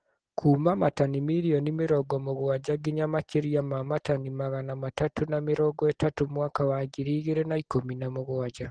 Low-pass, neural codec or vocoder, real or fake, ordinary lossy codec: 9.9 kHz; none; real; Opus, 16 kbps